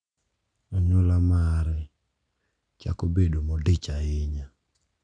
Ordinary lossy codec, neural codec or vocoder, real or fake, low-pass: none; none; real; 9.9 kHz